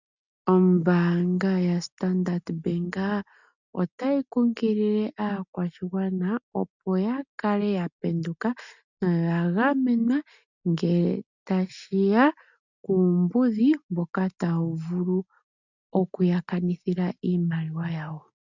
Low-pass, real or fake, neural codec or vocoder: 7.2 kHz; real; none